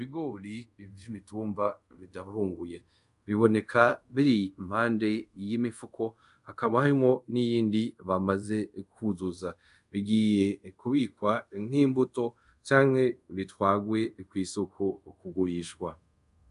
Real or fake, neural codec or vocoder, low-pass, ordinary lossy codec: fake; codec, 24 kHz, 0.5 kbps, DualCodec; 10.8 kHz; MP3, 96 kbps